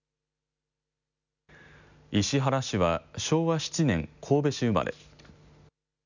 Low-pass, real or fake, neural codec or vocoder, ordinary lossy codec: 7.2 kHz; real; none; none